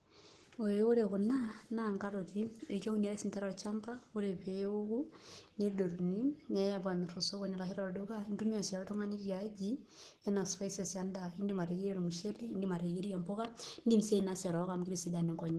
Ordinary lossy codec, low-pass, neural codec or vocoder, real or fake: Opus, 16 kbps; 14.4 kHz; codec, 44.1 kHz, 7.8 kbps, Pupu-Codec; fake